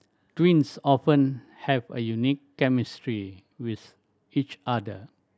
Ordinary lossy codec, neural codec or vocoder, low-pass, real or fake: none; none; none; real